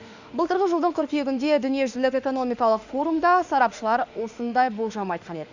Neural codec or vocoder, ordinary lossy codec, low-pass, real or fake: autoencoder, 48 kHz, 32 numbers a frame, DAC-VAE, trained on Japanese speech; Opus, 64 kbps; 7.2 kHz; fake